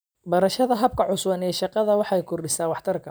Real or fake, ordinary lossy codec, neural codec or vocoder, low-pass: real; none; none; none